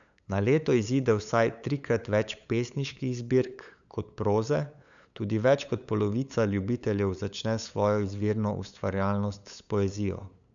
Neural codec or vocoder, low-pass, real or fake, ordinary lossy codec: codec, 16 kHz, 8 kbps, FunCodec, trained on LibriTTS, 25 frames a second; 7.2 kHz; fake; none